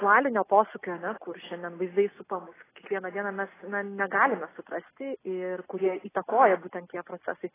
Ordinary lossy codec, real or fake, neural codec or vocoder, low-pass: AAC, 16 kbps; real; none; 3.6 kHz